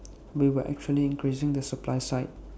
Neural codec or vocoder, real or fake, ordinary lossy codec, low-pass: none; real; none; none